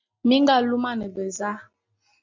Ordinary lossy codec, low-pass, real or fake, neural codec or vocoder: MP3, 48 kbps; 7.2 kHz; real; none